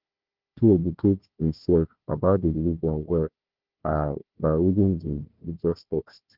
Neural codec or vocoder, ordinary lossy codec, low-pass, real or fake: codec, 16 kHz, 1 kbps, FunCodec, trained on Chinese and English, 50 frames a second; Opus, 16 kbps; 5.4 kHz; fake